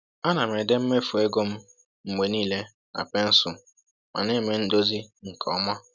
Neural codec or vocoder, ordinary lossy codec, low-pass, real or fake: none; none; none; real